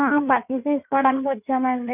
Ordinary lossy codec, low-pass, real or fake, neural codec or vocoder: none; 3.6 kHz; fake; codec, 16 kHz in and 24 kHz out, 2.2 kbps, FireRedTTS-2 codec